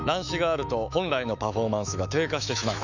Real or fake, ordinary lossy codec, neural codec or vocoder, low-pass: fake; none; autoencoder, 48 kHz, 128 numbers a frame, DAC-VAE, trained on Japanese speech; 7.2 kHz